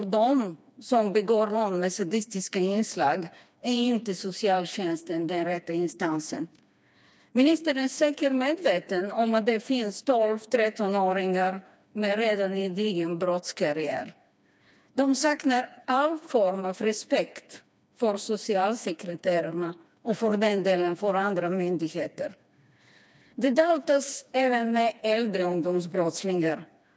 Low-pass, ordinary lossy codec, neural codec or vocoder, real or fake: none; none; codec, 16 kHz, 2 kbps, FreqCodec, smaller model; fake